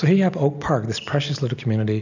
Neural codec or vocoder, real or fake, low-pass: none; real; 7.2 kHz